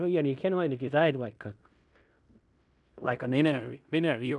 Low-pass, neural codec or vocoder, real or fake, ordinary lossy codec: 10.8 kHz; codec, 16 kHz in and 24 kHz out, 0.9 kbps, LongCat-Audio-Codec, fine tuned four codebook decoder; fake; none